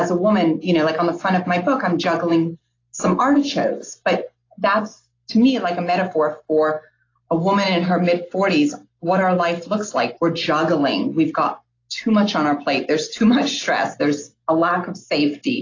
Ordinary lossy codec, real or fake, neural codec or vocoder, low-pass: AAC, 32 kbps; real; none; 7.2 kHz